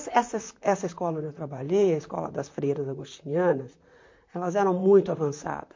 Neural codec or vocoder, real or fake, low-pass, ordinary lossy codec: vocoder, 44.1 kHz, 128 mel bands, Pupu-Vocoder; fake; 7.2 kHz; MP3, 48 kbps